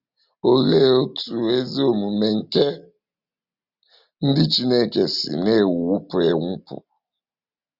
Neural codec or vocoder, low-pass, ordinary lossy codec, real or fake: none; 5.4 kHz; Opus, 64 kbps; real